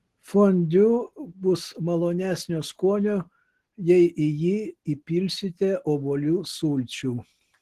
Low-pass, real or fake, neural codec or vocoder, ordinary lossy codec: 14.4 kHz; real; none; Opus, 16 kbps